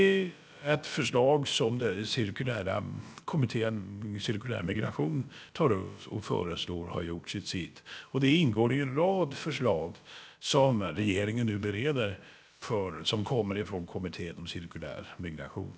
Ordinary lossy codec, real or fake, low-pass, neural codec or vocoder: none; fake; none; codec, 16 kHz, about 1 kbps, DyCAST, with the encoder's durations